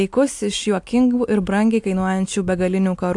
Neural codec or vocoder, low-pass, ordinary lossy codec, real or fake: none; 10.8 kHz; AAC, 64 kbps; real